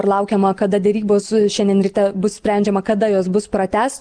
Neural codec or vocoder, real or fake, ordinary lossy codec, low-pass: none; real; Opus, 24 kbps; 9.9 kHz